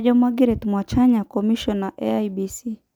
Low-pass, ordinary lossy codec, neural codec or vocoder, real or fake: 19.8 kHz; none; none; real